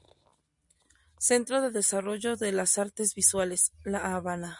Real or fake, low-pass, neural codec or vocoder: real; 10.8 kHz; none